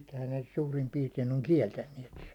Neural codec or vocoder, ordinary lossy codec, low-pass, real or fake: none; none; 19.8 kHz; real